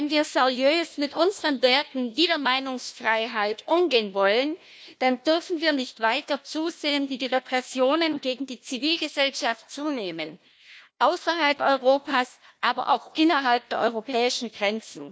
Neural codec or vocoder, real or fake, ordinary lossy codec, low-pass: codec, 16 kHz, 1 kbps, FunCodec, trained on Chinese and English, 50 frames a second; fake; none; none